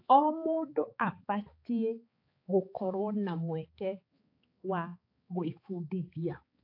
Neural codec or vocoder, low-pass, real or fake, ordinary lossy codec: codec, 16 kHz, 4 kbps, X-Codec, HuBERT features, trained on balanced general audio; 5.4 kHz; fake; AAC, 32 kbps